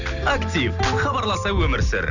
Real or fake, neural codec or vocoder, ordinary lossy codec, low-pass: real; none; none; 7.2 kHz